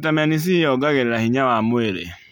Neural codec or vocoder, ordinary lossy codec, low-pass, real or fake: none; none; none; real